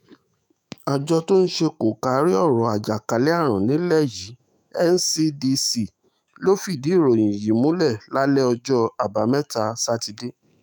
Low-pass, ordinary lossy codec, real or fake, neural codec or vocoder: none; none; fake; autoencoder, 48 kHz, 128 numbers a frame, DAC-VAE, trained on Japanese speech